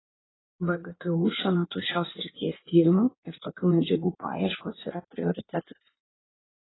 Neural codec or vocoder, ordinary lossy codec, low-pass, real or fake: codec, 16 kHz in and 24 kHz out, 1.1 kbps, FireRedTTS-2 codec; AAC, 16 kbps; 7.2 kHz; fake